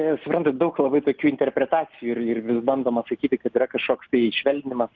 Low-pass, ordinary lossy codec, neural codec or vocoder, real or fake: 7.2 kHz; Opus, 24 kbps; none; real